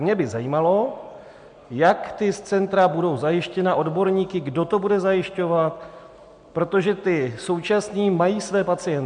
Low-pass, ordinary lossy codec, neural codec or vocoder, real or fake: 9.9 kHz; MP3, 64 kbps; none; real